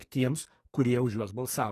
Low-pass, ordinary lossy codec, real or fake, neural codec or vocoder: 14.4 kHz; AAC, 48 kbps; fake; codec, 44.1 kHz, 2.6 kbps, SNAC